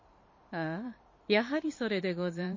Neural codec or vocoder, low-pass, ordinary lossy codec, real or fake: vocoder, 44.1 kHz, 128 mel bands every 512 samples, BigVGAN v2; 7.2 kHz; MP3, 32 kbps; fake